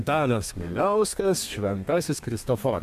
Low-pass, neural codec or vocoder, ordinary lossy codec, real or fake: 14.4 kHz; codec, 32 kHz, 1.9 kbps, SNAC; MP3, 96 kbps; fake